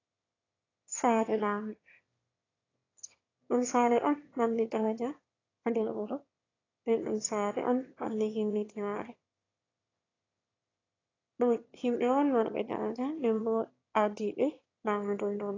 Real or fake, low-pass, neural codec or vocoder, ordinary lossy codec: fake; 7.2 kHz; autoencoder, 22.05 kHz, a latent of 192 numbers a frame, VITS, trained on one speaker; AAC, 32 kbps